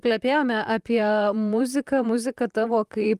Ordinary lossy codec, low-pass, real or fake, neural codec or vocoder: Opus, 32 kbps; 14.4 kHz; fake; vocoder, 44.1 kHz, 128 mel bands, Pupu-Vocoder